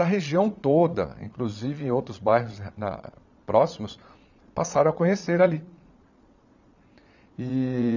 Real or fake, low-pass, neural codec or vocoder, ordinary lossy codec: fake; 7.2 kHz; vocoder, 22.05 kHz, 80 mel bands, Vocos; none